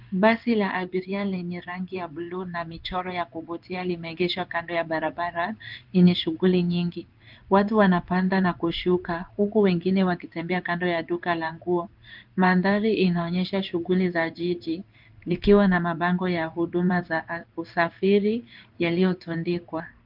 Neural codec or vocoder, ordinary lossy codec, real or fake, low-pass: codec, 16 kHz in and 24 kHz out, 1 kbps, XY-Tokenizer; Opus, 32 kbps; fake; 5.4 kHz